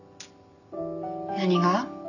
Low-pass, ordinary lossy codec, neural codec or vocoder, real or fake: 7.2 kHz; none; none; real